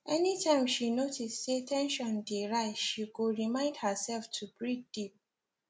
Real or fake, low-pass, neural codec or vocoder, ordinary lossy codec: real; none; none; none